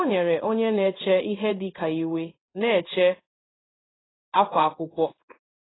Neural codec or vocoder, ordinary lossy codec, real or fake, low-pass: codec, 16 kHz in and 24 kHz out, 1 kbps, XY-Tokenizer; AAC, 16 kbps; fake; 7.2 kHz